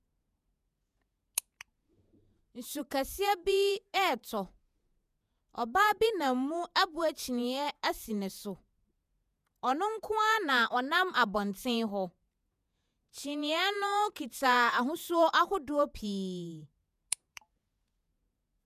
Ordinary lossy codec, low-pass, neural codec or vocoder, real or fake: none; 14.4 kHz; vocoder, 48 kHz, 128 mel bands, Vocos; fake